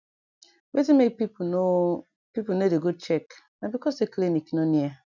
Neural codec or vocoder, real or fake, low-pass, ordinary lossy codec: none; real; 7.2 kHz; none